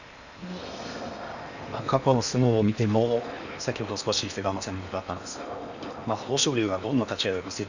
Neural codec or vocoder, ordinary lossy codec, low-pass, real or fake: codec, 16 kHz in and 24 kHz out, 0.8 kbps, FocalCodec, streaming, 65536 codes; none; 7.2 kHz; fake